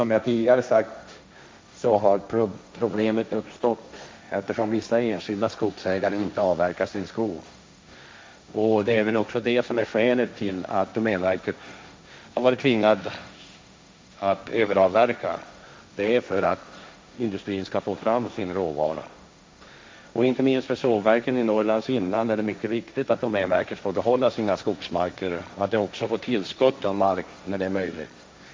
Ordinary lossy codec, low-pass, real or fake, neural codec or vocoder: none; none; fake; codec, 16 kHz, 1.1 kbps, Voila-Tokenizer